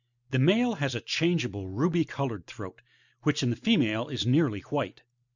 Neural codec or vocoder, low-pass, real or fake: none; 7.2 kHz; real